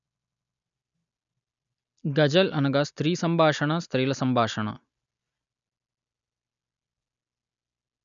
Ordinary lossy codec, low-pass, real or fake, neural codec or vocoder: none; 7.2 kHz; real; none